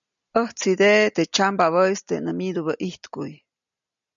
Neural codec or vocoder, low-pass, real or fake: none; 7.2 kHz; real